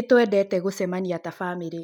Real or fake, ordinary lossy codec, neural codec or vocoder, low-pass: real; none; none; 19.8 kHz